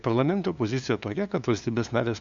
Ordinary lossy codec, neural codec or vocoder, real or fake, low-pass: Opus, 64 kbps; codec, 16 kHz, 2 kbps, FunCodec, trained on LibriTTS, 25 frames a second; fake; 7.2 kHz